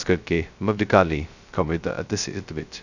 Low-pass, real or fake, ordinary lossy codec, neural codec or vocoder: 7.2 kHz; fake; none; codec, 16 kHz, 0.2 kbps, FocalCodec